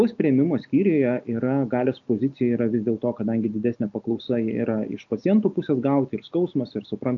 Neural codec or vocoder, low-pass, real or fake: none; 7.2 kHz; real